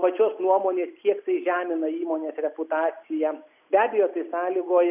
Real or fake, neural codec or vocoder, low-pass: real; none; 3.6 kHz